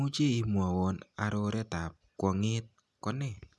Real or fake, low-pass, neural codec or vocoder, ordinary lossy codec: real; none; none; none